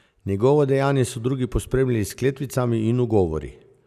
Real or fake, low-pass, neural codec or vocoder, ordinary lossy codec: real; 14.4 kHz; none; none